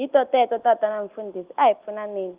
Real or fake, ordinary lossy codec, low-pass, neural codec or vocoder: real; Opus, 32 kbps; 3.6 kHz; none